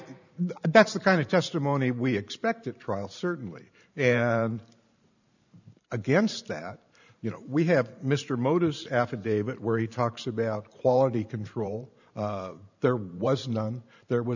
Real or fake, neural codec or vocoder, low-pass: fake; vocoder, 44.1 kHz, 128 mel bands every 512 samples, BigVGAN v2; 7.2 kHz